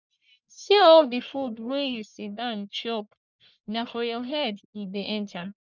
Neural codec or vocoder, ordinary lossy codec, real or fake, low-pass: codec, 44.1 kHz, 1.7 kbps, Pupu-Codec; none; fake; 7.2 kHz